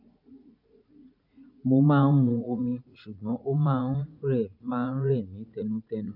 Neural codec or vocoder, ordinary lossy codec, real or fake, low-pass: vocoder, 44.1 kHz, 80 mel bands, Vocos; none; fake; 5.4 kHz